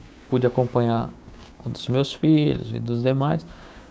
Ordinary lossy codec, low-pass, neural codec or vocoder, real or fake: none; none; codec, 16 kHz, 6 kbps, DAC; fake